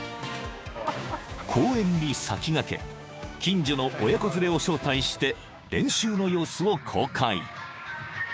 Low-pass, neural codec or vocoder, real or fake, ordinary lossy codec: none; codec, 16 kHz, 6 kbps, DAC; fake; none